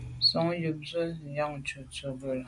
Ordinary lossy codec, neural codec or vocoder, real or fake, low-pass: MP3, 64 kbps; none; real; 10.8 kHz